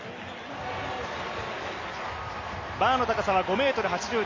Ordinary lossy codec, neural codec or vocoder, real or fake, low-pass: MP3, 32 kbps; none; real; 7.2 kHz